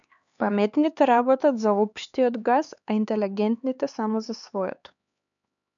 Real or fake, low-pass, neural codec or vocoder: fake; 7.2 kHz; codec, 16 kHz, 4 kbps, X-Codec, HuBERT features, trained on LibriSpeech